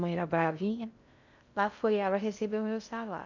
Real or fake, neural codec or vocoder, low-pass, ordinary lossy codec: fake; codec, 16 kHz in and 24 kHz out, 0.6 kbps, FocalCodec, streaming, 2048 codes; 7.2 kHz; none